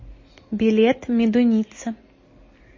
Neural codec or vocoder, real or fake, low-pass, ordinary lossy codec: none; real; 7.2 kHz; MP3, 32 kbps